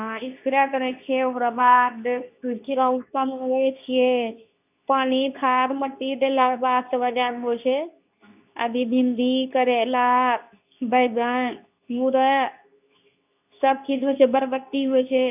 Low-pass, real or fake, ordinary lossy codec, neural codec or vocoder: 3.6 kHz; fake; none; codec, 24 kHz, 0.9 kbps, WavTokenizer, medium speech release version 2